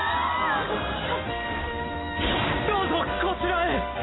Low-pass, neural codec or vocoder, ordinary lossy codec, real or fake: 7.2 kHz; none; AAC, 16 kbps; real